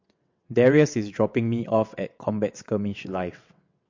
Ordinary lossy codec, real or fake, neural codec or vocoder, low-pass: MP3, 48 kbps; fake; vocoder, 22.05 kHz, 80 mel bands, WaveNeXt; 7.2 kHz